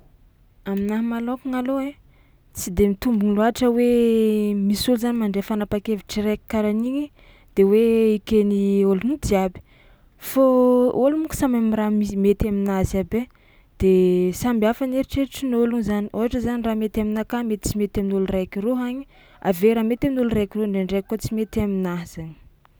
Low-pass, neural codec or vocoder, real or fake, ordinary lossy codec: none; none; real; none